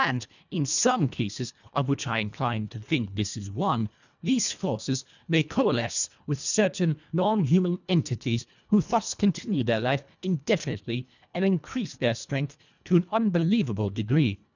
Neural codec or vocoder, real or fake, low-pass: codec, 24 kHz, 1.5 kbps, HILCodec; fake; 7.2 kHz